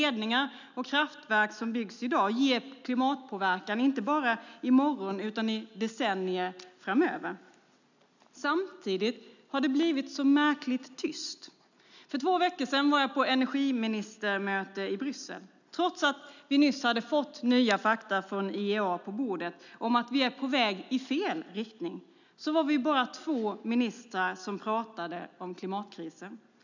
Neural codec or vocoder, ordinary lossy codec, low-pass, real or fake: none; none; 7.2 kHz; real